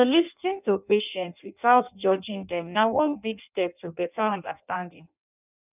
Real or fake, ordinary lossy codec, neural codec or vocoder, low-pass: fake; none; codec, 16 kHz in and 24 kHz out, 0.6 kbps, FireRedTTS-2 codec; 3.6 kHz